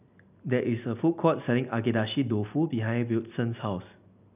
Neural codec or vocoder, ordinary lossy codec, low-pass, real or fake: none; none; 3.6 kHz; real